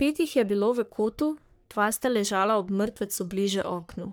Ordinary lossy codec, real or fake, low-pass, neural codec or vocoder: none; fake; none; codec, 44.1 kHz, 3.4 kbps, Pupu-Codec